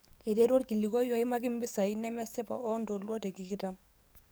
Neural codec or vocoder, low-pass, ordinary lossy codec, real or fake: codec, 44.1 kHz, 7.8 kbps, DAC; none; none; fake